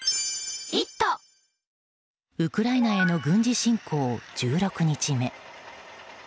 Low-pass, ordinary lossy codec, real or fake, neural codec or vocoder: none; none; real; none